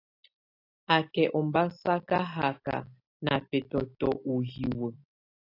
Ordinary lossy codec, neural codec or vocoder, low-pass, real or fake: AAC, 32 kbps; none; 5.4 kHz; real